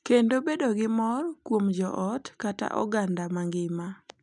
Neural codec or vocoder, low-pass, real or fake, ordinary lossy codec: none; 10.8 kHz; real; none